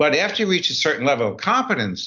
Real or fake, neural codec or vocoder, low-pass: real; none; 7.2 kHz